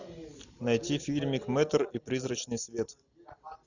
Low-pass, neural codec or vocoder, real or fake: 7.2 kHz; none; real